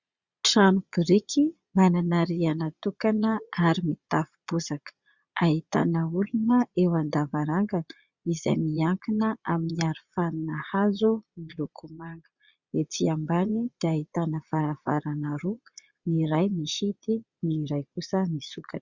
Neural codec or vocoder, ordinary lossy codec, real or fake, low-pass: vocoder, 22.05 kHz, 80 mel bands, Vocos; Opus, 64 kbps; fake; 7.2 kHz